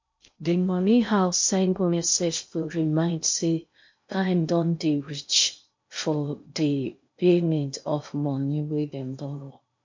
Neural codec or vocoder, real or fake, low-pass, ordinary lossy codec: codec, 16 kHz in and 24 kHz out, 0.6 kbps, FocalCodec, streaming, 2048 codes; fake; 7.2 kHz; MP3, 48 kbps